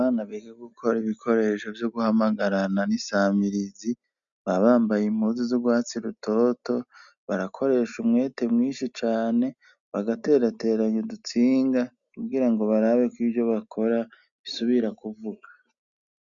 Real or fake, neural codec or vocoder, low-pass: real; none; 7.2 kHz